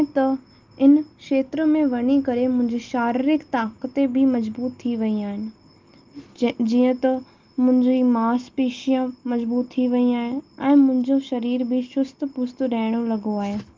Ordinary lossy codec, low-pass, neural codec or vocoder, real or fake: Opus, 32 kbps; 7.2 kHz; none; real